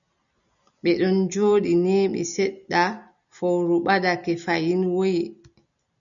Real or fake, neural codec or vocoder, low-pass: real; none; 7.2 kHz